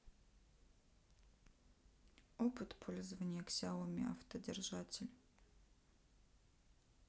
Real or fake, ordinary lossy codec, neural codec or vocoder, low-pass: real; none; none; none